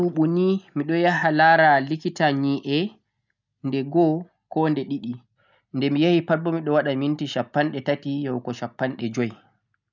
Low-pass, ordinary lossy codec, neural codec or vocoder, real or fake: 7.2 kHz; none; none; real